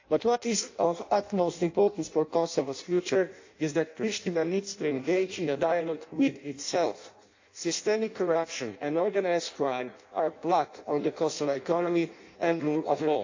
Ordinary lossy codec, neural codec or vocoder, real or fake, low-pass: AAC, 48 kbps; codec, 16 kHz in and 24 kHz out, 0.6 kbps, FireRedTTS-2 codec; fake; 7.2 kHz